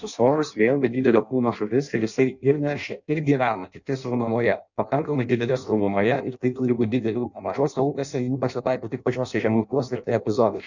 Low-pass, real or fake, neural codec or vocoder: 7.2 kHz; fake; codec, 16 kHz in and 24 kHz out, 0.6 kbps, FireRedTTS-2 codec